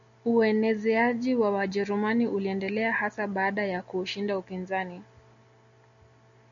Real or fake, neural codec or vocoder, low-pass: real; none; 7.2 kHz